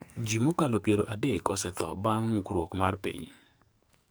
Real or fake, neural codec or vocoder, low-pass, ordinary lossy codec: fake; codec, 44.1 kHz, 2.6 kbps, SNAC; none; none